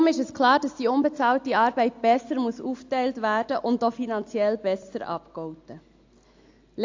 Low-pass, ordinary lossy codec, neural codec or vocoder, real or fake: 7.2 kHz; AAC, 48 kbps; none; real